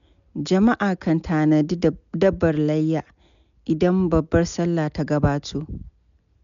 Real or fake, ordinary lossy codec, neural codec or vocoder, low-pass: real; none; none; 7.2 kHz